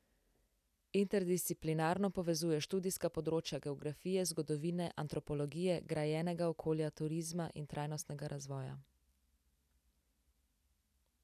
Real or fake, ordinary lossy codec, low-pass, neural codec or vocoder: real; none; 14.4 kHz; none